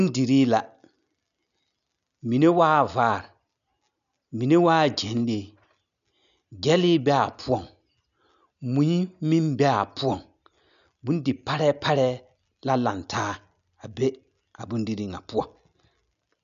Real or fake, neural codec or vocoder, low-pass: real; none; 7.2 kHz